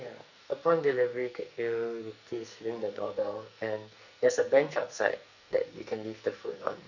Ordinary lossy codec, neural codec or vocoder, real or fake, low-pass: none; codec, 44.1 kHz, 2.6 kbps, SNAC; fake; 7.2 kHz